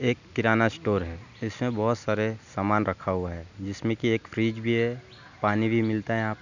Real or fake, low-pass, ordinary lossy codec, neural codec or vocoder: real; 7.2 kHz; none; none